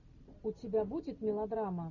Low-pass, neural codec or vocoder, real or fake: 7.2 kHz; none; real